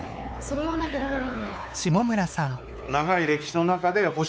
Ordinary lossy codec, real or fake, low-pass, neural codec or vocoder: none; fake; none; codec, 16 kHz, 4 kbps, X-Codec, WavLM features, trained on Multilingual LibriSpeech